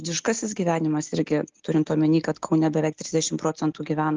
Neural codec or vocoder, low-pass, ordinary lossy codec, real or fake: none; 7.2 kHz; Opus, 24 kbps; real